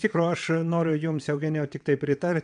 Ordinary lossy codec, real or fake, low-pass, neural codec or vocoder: MP3, 96 kbps; fake; 9.9 kHz; vocoder, 22.05 kHz, 80 mel bands, WaveNeXt